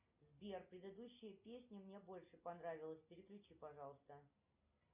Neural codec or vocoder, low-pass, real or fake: none; 3.6 kHz; real